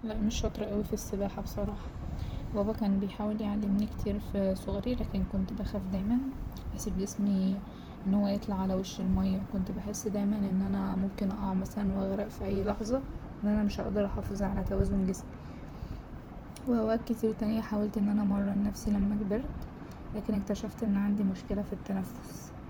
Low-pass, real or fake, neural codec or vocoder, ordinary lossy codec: none; fake; vocoder, 44.1 kHz, 128 mel bands, Pupu-Vocoder; none